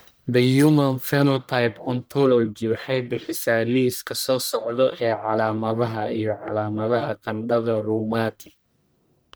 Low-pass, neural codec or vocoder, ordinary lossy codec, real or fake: none; codec, 44.1 kHz, 1.7 kbps, Pupu-Codec; none; fake